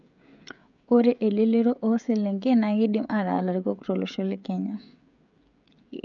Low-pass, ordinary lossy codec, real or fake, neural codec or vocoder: 7.2 kHz; none; fake; codec, 16 kHz, 16 kbps, FreqCodec, smaller model